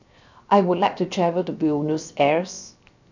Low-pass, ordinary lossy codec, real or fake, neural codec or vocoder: 7.2 kHz; none; fake; codec, 16 kHz, 0.7 kbps, FocalCodec